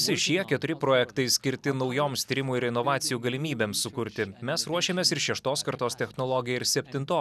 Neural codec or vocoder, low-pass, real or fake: none; 14.4 kHz; real